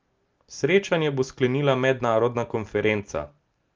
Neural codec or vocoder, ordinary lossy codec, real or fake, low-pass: none; Opus, 24 kbps; real; 7.2 kHz